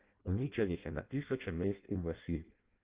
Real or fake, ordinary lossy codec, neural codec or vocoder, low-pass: fake; Opus, 24 kbps; codec, 16 kHz in and 24 kHz out, 0.6 kbps, FireRedTTS-2 codec; 3.6 kHz